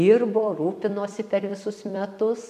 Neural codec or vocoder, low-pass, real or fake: vocoder, 44.1 kHz, 128 mel bands every 256 samples, BigVGAN v2; 14.4 kHz; fake